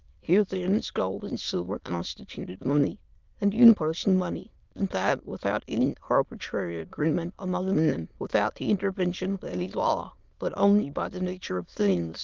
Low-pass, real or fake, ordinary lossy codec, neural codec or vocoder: 7.2 kHz; fake; Opus, 32 kbps; autoencoder, 22.05 kHz, a latent of 192 numbers a frame, VITS, trained on many speakers